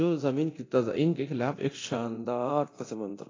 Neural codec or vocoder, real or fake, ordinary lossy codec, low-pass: codec, 24 kHz, 0.9 kbps, DualCodec; fake; AAC, 32 kbps; 7.2 kHz